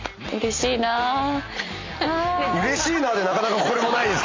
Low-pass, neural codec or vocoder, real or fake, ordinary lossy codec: 7.2 kHz; none; real; MP3, 48 kbps